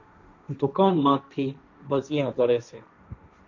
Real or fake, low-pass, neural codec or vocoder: fake; 7.2 kHz; codec, 16 kHz, 1.1 kbps, Voila-Tokenizer